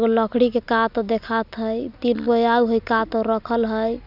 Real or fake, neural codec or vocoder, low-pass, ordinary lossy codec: real; none; 5.4 kHz; none